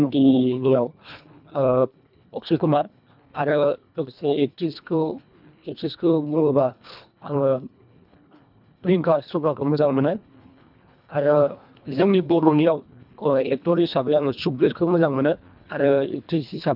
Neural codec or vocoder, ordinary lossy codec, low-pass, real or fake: codec, 24 kHz, 1.5 kbps, HILCodec; none; 5.4 kHz; fake